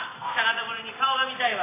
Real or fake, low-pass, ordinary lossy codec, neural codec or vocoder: real; 3.6 kHz; AAC, 16 kbps; none